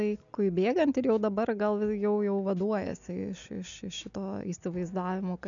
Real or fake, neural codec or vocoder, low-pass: real; none; 7.2 kHz